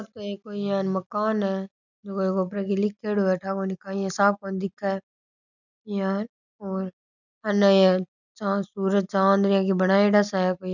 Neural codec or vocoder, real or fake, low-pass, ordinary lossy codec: none; real; 7.2 kHz; none